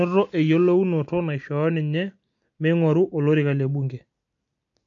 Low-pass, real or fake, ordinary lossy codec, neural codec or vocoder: 7.2 kHz; real; MP3, 48 kbps; none